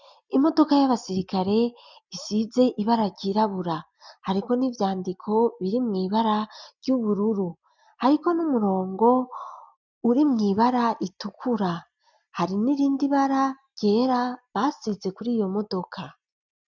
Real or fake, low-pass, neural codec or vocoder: real; 7.2 kHz; none